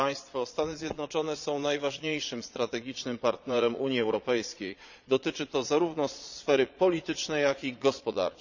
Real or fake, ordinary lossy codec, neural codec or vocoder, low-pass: fake; none; vocoder, 22.05 kHz, 80 mel bands, Vocos; 7.2 kHz